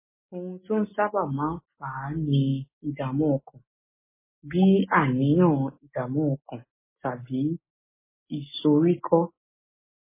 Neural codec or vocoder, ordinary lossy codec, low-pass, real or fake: none; MP3, 16 kbps; 3.6 kHz; real